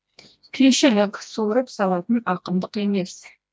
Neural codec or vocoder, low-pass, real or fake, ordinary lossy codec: codec, 16 kHz, 1 kbps, FreqCodec, smaller model; none; fake; none